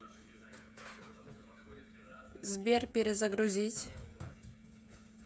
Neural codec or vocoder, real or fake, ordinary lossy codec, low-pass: codec, 16 kHz, 8 kbps, FreqCodec, smaller model; fake; none; none